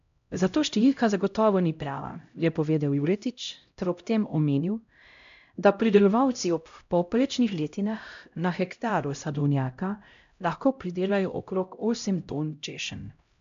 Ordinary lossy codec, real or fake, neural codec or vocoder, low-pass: MP3, 64 kbps; fake; codec, 16 kHz, 0.5 kbps, X-Codec, HuBERT features, trained on LibriSpeech; 7.2 kHz